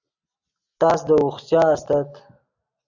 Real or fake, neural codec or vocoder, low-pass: real; none; 7.2 kHz